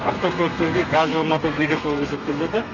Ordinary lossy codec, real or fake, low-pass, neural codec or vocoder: none; fake; 7.2 kHz; codec, 32 kHz, 1.9 kbps, SNAC